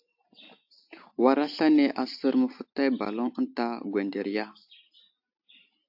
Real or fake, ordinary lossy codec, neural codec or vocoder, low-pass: real; AAC, 48 kbps; none; 5.4 kHz